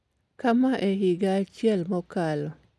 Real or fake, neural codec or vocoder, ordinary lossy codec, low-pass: real; none; none; none